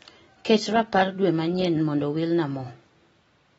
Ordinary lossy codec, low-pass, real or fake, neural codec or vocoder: AAC, 24 kbps; 19.8 kHz; real; none